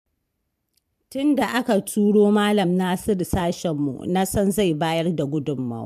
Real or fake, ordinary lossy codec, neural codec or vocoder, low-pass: fake; MP3, 96 kbps; vocoder, 44.1 kHz, 128 mel bands every 512 samples, BigVGAN v2; 14.4 kHz